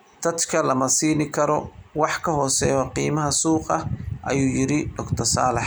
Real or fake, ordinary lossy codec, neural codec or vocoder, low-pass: real; none; none; none